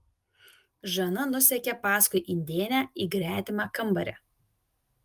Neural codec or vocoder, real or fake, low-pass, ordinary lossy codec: none; real; 14.4 kHz; Opus, 32 kbps